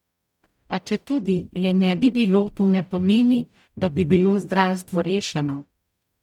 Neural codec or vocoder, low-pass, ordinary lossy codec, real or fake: codec, 44.1 kHz, 0.9 kbps, DAC; 19.8 kHz; none; fake